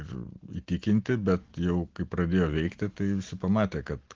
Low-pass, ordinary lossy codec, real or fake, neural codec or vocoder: 7.2 kHz; Opus, 16 kbps; real; none